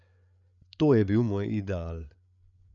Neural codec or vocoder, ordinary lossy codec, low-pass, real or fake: codec, 16 kHz, 8 kbps, FreqCodec, larger model; none; 7.2 kHz; fake